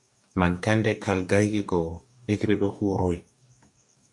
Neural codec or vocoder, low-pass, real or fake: codec, 44.1 kHz, 2.6 kbps, DAC; 10.8 kHz; fake